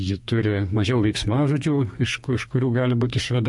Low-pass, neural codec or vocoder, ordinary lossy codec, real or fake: 10.8 kHz; codec, 32 kHz, 1.9 kbps, SNAC; MP3, 48 kbps; fake